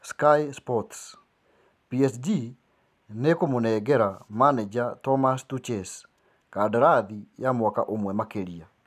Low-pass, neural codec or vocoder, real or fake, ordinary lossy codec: 14.4 kHz; none; real; none